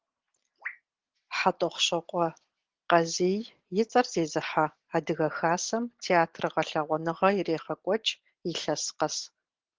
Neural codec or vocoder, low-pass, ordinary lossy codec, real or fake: none; 7.2 kHz; Opus, 16 kbps; real